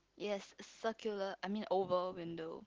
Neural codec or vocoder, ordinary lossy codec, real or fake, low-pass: none; Opus, 16 kbps; real; 7.2 kHz